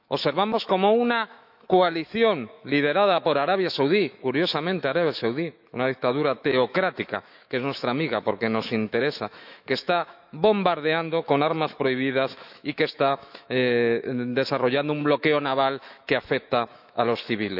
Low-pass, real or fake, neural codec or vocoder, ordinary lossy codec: 5.4 kHz; fake; autoencoder, 48 kHz, 128 numbers a frame, DAC-VAE, trained on Japanese speech; none